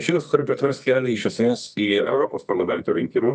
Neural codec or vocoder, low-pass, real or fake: codec, 24 kHz, 0.9 kbps, WavTokenizer, medium music audio release; 9.9 kHz; fake